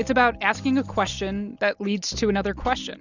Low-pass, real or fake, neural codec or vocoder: 7.2 kHz; real; none